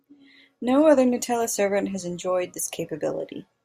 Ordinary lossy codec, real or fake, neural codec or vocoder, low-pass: Opus, 64 kbps; real; none; 14.4 kHz